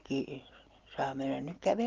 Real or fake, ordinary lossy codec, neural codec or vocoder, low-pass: real; Opus, 16 kbps; none; 7.2 kHz